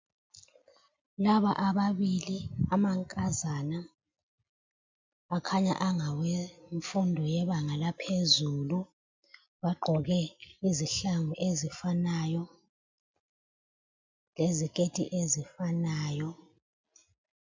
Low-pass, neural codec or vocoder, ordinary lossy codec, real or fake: 7.2 kHz; none; MP3, 64 kbps; real